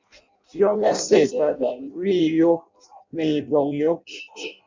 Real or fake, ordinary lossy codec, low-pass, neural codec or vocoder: fake; MP3, 64 kbps; 7.2 kHz; codec, 16 kHz in and 24 kHz out, 0.6 kbps, FireRedTTS-2 codec